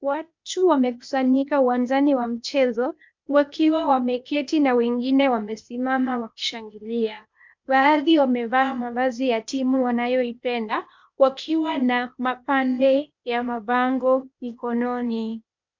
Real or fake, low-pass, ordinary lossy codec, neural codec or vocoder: fake; 7.2 kHz; MP3, 64 kbps; codec, 16 kHz, 0.8 kbps, ZipCodec